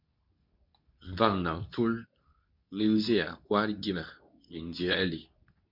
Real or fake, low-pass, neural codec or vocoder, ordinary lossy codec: fake; 5.4 kHz; codec, 24 kHz, 0.9 kbps, WavTokenizer, medium speech release version 2; MP3, 48 kbps